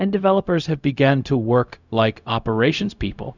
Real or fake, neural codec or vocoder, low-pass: fake; codec, 16 kHz, 0.4 kbps, LongCat-Audio-Codec; 7.2 kHz